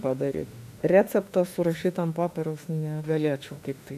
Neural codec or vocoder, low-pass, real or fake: autoencoder, 48 kHz, 32 numbers a frame, DAC-VAE, trained on Japanese speech; 14.4 kHz; fake